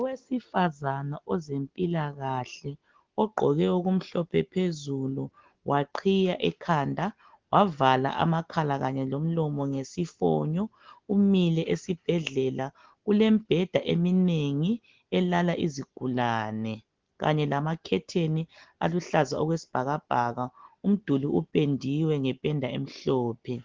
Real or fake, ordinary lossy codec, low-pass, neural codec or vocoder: real; Opus, 16 kbps; 7.2 kHz; none